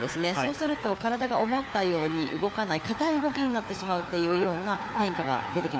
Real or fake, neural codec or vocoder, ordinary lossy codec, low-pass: fake; codec, 16 kHz, 4 kbps, FunCodec, trained on LibriTTS, 50 frames a second; none; none